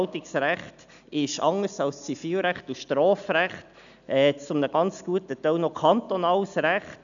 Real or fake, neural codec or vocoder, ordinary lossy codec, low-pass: real; none; none; 7.2 kHz